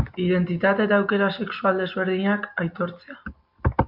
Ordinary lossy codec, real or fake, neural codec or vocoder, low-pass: MP3, 48 kbps; real; none; 5.4 kHz